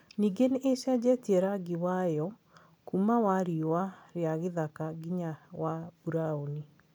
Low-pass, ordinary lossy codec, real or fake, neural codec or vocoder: none; none; real; none